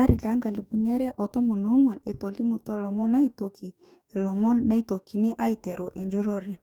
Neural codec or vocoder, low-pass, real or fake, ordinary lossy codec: codec, 44.1 kHz, 2.6 kbps, DAC; 19.8 kHz; fake; none